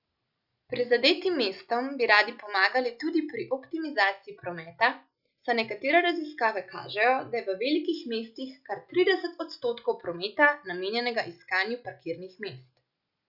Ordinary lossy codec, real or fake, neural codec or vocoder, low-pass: Opus, 64 kbps; real; none; 5.4 kHz